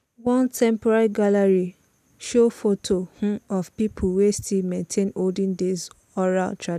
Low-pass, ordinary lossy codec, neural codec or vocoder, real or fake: 14.4 kHz; none; none; real